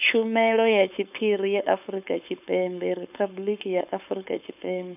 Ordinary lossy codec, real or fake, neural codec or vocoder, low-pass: none; fake; codec, 16 kHz, 4.8 kbps, FACodec; 3.6 kHz